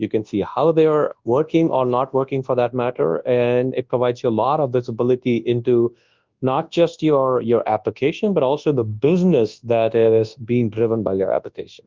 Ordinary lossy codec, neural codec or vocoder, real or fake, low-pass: Opus, 32 kbps; codec, 24 kHz, 0.9 kbps, WavTokenizer, large speech release; fake; 7.2 kHz